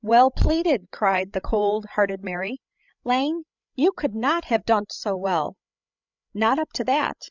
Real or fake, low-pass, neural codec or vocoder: fake; 7.2 kHz; codec, 16 kHz, 16 kbps, FreqCodec, larger model